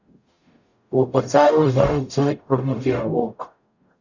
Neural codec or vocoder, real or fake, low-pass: codec, 44.1 kHz, 0.9 kbps, DAC; fake; 7.2 kHz